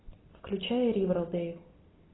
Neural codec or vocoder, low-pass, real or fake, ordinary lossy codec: none; 7.2 kHz; real; AAC, 16 kbps